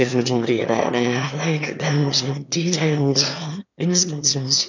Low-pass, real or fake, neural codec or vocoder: 7.2 kHz; fake; autoencoder, 22.05 kHz, a latent of 192 numbers a frame, VITS, trained on one speaker